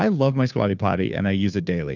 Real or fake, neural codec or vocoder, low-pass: fake; codec, 16 kHz, 2 kbps, FunCodec, trained on Chinese and English, 25 frames a second; 7.2 kHz